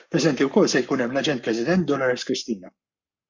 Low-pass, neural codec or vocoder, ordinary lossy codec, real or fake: 7.2 kHz; codec, 44.1 kHz, 7.8 kbps, Pupu-Codec; MP3, 64 kbps; fake